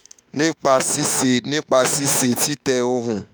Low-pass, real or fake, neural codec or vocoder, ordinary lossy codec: none; fake; autoencoder, 48 kHz, 32 numbers a frame, DAC-VAE, trained on Japanese speech; none